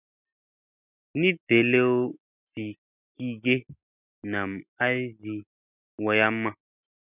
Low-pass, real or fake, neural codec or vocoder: 3.6 kHz; real; none